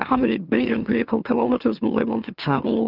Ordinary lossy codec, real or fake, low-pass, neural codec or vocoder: Opus, 32 kbps; fake; 5.4 kHz; autoencoder, 44.1 kHz, a latent of 192 numbers a frame, MeloTTS